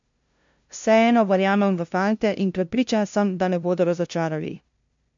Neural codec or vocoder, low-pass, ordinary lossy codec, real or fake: codec, 16 kHz, 0.5 kbps, FunCodec, trained on LibriTTS, 25 frames a second; 7.2 kHz; MP3, 64 kbps; fake